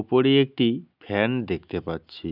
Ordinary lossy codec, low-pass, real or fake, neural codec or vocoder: none; 5.4 kHz; real; none